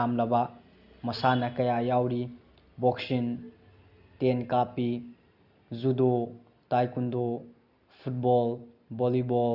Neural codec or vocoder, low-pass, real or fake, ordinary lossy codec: none; 5.4 kHz; real; Opus, 64 kbps